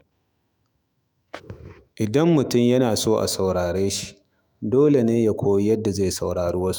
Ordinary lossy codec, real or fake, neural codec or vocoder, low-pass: none; fake; autoencoder, 48 kHz, 128 numbers a frame, DAC-VAE, trained on Japanese speech; none